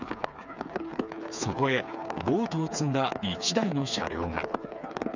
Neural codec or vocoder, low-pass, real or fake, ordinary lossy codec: codec, 16 kHz, 4 kbps, FreqCodec, smaller model; 7.2 kHz; fake; none